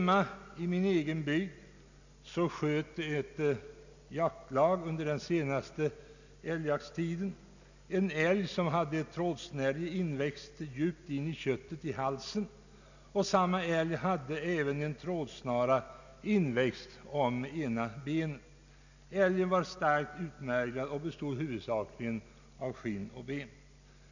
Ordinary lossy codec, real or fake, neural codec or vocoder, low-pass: none; real; none; 7.2 kHz